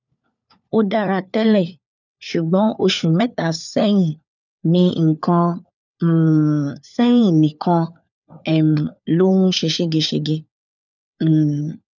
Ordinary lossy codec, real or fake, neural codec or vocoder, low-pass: none; fake; codec, 16 kHz, 4 kbps, FunCodec, trained on LibriTTS, 50 frames a second; 7.2 kHz